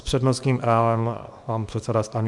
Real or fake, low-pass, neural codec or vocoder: fake; 10.8 kHz; codec, 24 kHz, 0.9 kbps, WavTokenizer, small release